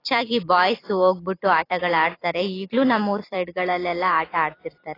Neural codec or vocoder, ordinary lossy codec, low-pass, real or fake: vocoder, 44.1 kHz, 128 mel bands every 256 samples, BigVGAN v2; AAC, 24 kbps; 5.4 kHz; fake